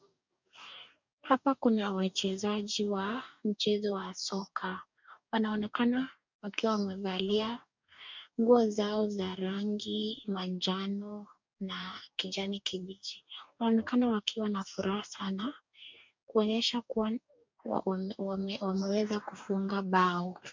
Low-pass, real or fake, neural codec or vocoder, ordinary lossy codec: 7.2 kHz; fake; codec, 44.1 kHz, 2.6 kbps, DAC; MP3, 64 kbps